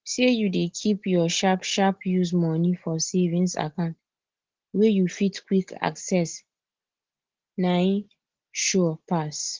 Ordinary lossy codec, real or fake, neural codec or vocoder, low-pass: Opus, 16 kbps; real; none; 7.2 kHz